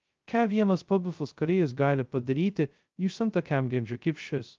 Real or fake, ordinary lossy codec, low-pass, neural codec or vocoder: fake; Opus, 24 kbps; 7.2 kHz; codec, 16 kHz, 0.2 kbps, FocalCodec